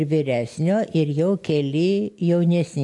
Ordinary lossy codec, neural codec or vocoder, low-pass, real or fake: AAC, 64 kbps; none; 10.8 kHz; real